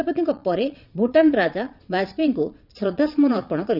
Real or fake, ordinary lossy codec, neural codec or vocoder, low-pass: fake; none; vocoder, 22.05 kHz, 80 mel bands, Vocos; 5.4 kHz